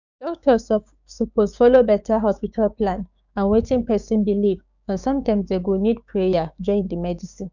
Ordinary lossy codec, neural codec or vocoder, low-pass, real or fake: none; codec, 16 kHz, 6 kbps, DAC; 7.2 kHz; fake